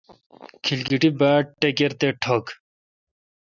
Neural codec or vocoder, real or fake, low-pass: none; real; 7.2 kHz